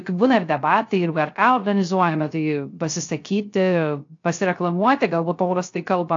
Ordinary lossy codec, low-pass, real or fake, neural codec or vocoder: AAC, 48 kbps; 7.2 kHz; fake; codec, 16 kHz, 0.3 kbps, FocalCodec